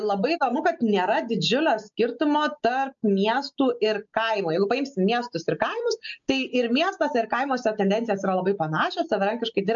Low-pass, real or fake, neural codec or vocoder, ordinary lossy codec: 7.2 kHz; real; none; MP3, 64 kbps